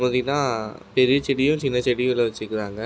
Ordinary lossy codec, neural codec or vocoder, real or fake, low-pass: none; none; real; none